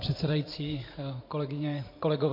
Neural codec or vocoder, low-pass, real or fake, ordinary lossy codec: vocoder, 44.1 kHz, 128 mel bands every 512 samples, BigVGAN v2; 5.4 kHz; fake; MP3, 32 kbps